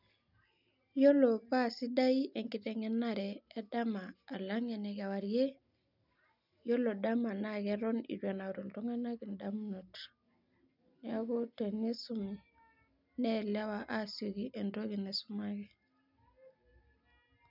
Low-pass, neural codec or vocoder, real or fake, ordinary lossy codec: 5.4 kHz; none; real; none